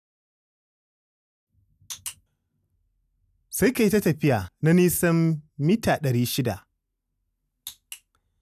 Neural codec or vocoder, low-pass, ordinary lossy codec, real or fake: none; 14.4 kHz; none; real